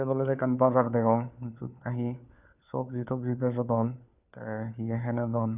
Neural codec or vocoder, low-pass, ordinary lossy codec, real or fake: codec, 16 kHz, 4 kbps, FunCodec, trained on LibriTTS, 50 frames a second; 3.6 kHz; none; fake